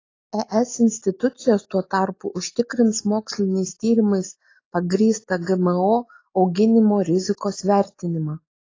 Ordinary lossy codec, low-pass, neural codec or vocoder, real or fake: AAC, 32 kbps; 7.2 kHz; none; real